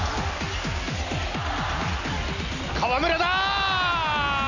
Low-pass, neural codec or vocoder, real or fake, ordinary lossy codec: 7.2 kHz; none; real; none